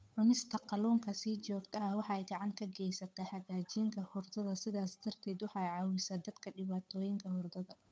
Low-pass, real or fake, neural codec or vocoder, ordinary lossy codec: none; fake; codec, 16 kHz, 8 kbps, FunCodec, trained on Chinese and English, 25 frames a second; none